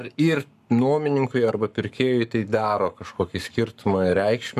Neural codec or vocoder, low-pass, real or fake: codec, 44.1 kHz, 7.8 kbps, Pupu-Codec; 14.4 kHz; fake